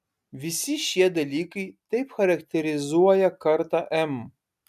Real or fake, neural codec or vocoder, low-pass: real; none; 14.4 kHz